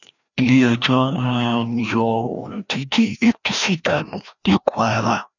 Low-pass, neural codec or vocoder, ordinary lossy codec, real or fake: 7.2 kHz; codec, 16 kHz, 1 kbps, FreqCodec, larger model; none; fake